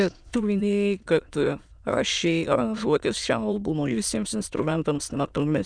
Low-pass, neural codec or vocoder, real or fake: 9.9 kHz; autoencoder, 22.05 kHz, a latent of 192 numbers a frame, VITS, trained on many speakers; fake